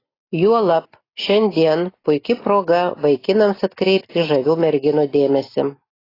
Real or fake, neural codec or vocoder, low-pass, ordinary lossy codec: real; none; 5.4 kHz; AAC, 24 kbps